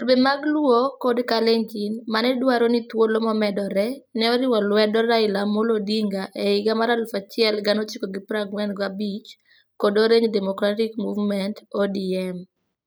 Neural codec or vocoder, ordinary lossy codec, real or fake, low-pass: none; none; real; none